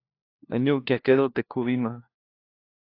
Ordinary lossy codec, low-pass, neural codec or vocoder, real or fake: AAC, 32 kbps; 5.4 kHz; codec, 16 kHz, 1 kbps, FunCodec, trained on LibriTTS, 50 frames a second; fake